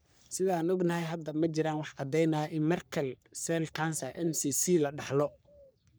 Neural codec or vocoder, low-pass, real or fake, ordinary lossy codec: codec, 44.1 kHz, 3.4 kbps, Pupu-Codec; none; fake; none